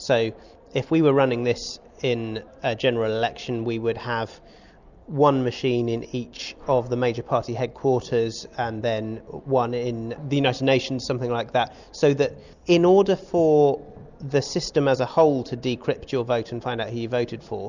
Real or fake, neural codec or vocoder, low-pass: real; none; 7.2 kHz